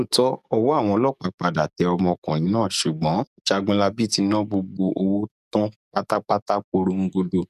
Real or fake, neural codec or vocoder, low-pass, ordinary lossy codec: fake; codec, 44.1 kHz, 7.8 kbps, DAC; 14.4 kHz; none